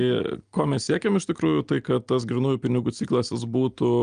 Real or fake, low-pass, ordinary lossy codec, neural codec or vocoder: real; 9.9 kHz; Opus, 32 kbps; none